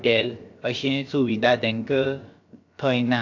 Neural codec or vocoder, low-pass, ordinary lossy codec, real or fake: codec, 16 kHz, about 1 kbps, DyCAST, with the encoder's durations; 7.2 kHz; AAC, 48 kbps; fake